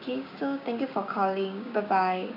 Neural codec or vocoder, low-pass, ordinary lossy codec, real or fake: codec, 16 kHz, 6 kbps, DAC; 5.4 kHz; none; fake